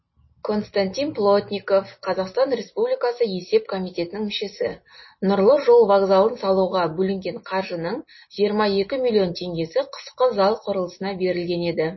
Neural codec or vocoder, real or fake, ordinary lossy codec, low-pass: none; real; MP3, 24 kbps; 7.2 kHz